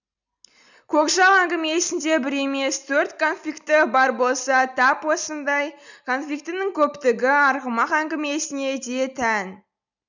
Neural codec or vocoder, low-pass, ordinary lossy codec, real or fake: none; 7.2 kHz; none; real